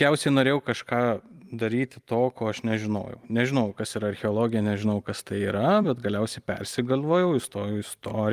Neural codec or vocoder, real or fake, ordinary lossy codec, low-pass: vocoder, 44.1 kHz, 128 mel bands every 256 samples, BigVGAN v2; fake; Opus, 32 kbps; 14.4 kHz